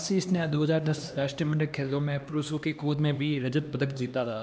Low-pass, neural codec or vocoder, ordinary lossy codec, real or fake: none; codec, 16 kHz, 2 kbps, X-Codec, HuBERT features, trained on LibriSpeech; none; fake